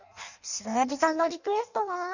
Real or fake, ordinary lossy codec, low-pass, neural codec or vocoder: fake; none; 7.2 kHz; codec, 16 kHz in and 24 kHz out, 0.6 kbps, FireRedTTS-2 codec